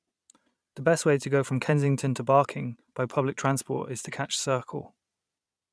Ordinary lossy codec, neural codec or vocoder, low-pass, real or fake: none; vocoder, 22.05 kHz, 80 mel bands, Vocos; none; fake